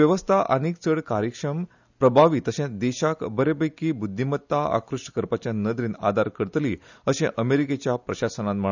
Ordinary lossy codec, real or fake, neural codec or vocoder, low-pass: none; real; none; 7.2 kHz